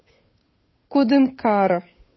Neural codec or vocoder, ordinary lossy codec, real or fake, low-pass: codec, 16 kHz, 8 kbps, FunCodec, trained on Chinese and English, 25 frames a second; MP3, 24 kbps; fake; 7.2 kHz